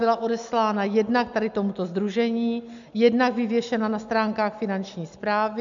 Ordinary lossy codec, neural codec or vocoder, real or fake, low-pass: MP3, 64 kbps; none; real; 7.2 kHz